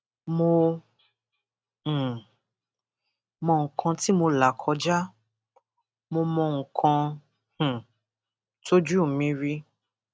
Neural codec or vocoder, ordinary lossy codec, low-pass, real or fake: none; none; none; real